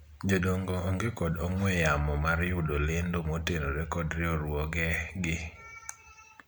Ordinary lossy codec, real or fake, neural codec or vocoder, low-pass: none; real; none; none